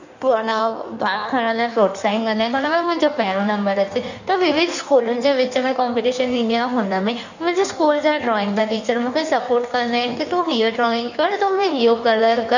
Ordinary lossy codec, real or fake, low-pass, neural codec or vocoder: none; fake; 7.2 kHz; codec, 16 kHz in and 24 kHz out, 1.1 kbps, FireRedTTS-2 codec